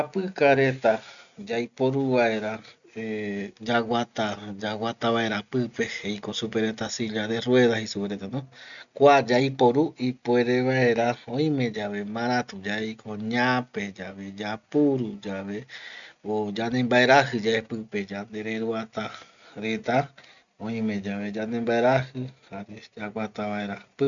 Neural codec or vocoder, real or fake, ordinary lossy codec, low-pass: none; real; none; 7.2 kHz